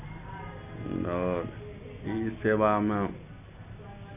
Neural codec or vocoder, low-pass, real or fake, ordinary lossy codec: none; 3.6 kHz; real; none